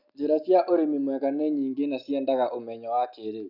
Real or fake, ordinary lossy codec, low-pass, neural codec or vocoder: real; none; 5.4 kHz; none